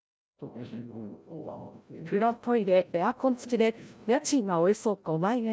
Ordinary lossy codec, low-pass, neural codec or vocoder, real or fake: none; none; codec, 16 kHz, 0.5 kbps, FreqCodec, larger model; fake